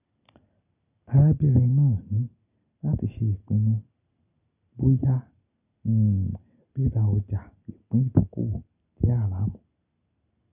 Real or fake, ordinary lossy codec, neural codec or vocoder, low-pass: real; none; none; 3.6 kHz